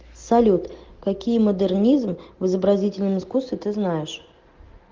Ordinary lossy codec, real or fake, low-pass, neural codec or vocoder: Opus, 16 kbps; real; 7.2 kHz; none